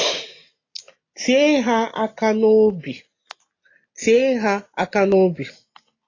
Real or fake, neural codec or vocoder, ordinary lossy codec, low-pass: real; none; AAC, 32 kbps; 7.2 kHz